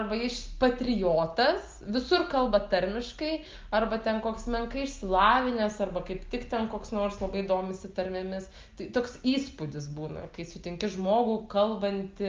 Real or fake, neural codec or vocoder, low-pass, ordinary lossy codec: real; none; 7.2 kHz; Opus, 32 kbps